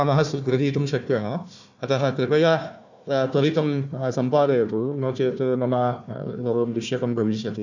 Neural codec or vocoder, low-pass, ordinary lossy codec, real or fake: codec, 16 kHz, 1 kbps, FunCodec, trained on Chinese and English, 50 frames a second; 7.2 kHz; none; fake